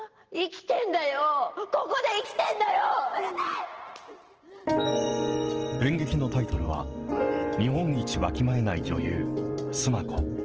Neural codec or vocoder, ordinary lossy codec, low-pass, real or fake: vocoder, 44.1 kHz, 128 mel bands every 512 samples, BigVGAN v2; Opus, 16 kbps; 7.2 kHz; fake